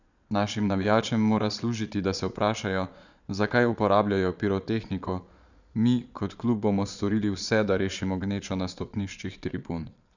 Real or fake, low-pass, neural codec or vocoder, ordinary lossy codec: fake; 7.2 kHz; vocoder, 22.05 kHz, 80 mel bands, Vocos; none